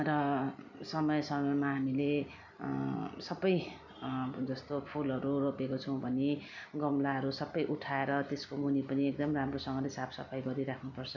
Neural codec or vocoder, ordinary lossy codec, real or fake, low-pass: none; none; real; 7.2 kHz